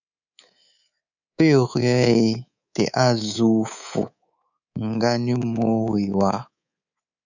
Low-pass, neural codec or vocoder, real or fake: 7.2 kHz; codec, 24 kHz, 3.1 kbps, DualCodec; fake